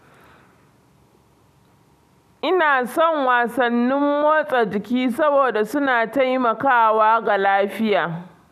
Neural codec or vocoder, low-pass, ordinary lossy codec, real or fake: none; 14.4 kHz; none; real